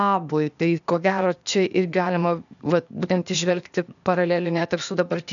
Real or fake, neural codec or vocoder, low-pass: fake; codec, 16 kHz, 0.8 kbps, ZipCodec; 7.2 kHz